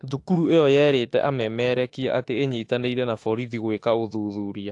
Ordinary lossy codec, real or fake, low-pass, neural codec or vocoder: AAC, 48 kbps; fake; 10.8 kHz; autoencoder, 48 kHz, 32 numbers a frame, DAC-VAE, trained on Japanese speech